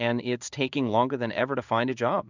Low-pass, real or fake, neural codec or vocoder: 7.2 kHz; fake; codec, 16 kHz in and 24 kHz out, 1 kbps, XY-Tokenizer